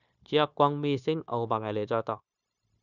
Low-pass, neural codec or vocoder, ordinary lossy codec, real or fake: 7.2 kHz; codec, 16 kHz, 0.9 kbps, LongCat-Audio-Codec; none; fake